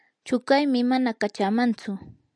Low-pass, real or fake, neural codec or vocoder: 9.9 kHz; real; none